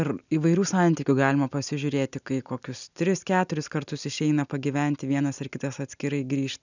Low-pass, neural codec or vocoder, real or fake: 7.2 kHz; none; real